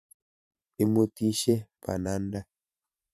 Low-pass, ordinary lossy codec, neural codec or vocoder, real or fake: 14.4 kHz; none; vocoder, 44.1 kHz, 128 mel bands every 512 samples, BigVGAN v2; fake